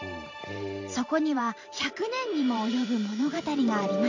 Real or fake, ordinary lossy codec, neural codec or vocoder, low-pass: fake; MP3, 48 kbps; vocoder, 44.1 kHz, 128 mel bands every 512 samples, BigVGAN v2; 7.2 kHz